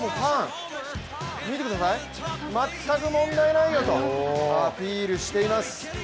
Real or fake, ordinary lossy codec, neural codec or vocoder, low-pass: real; none; none; none